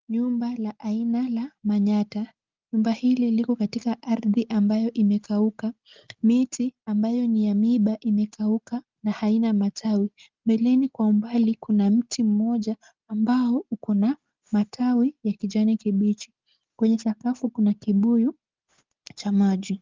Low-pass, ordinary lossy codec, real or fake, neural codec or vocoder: 7.2 kHz; Opus, 32 kbps; real; none